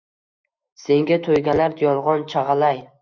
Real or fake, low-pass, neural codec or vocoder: fake; 7.2 kHz; autoencoder, 48 kHz, 128 numbers a frame, DAC-VAE, trained on Japanese speech